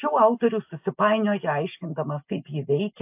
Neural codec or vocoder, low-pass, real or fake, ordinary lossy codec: none; 3.6 kHz; real; AAC, 32 kbps